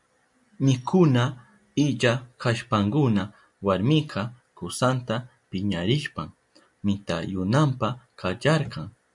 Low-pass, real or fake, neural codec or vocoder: 10.8 kHz; real; none